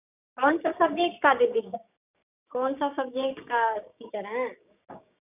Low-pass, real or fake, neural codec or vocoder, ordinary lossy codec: 3.6 kHz; fake; codec, 16 kHz, 6 kbps, DAC; none